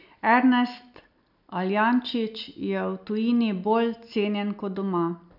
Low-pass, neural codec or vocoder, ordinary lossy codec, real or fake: 5.4 kHz; none; none; real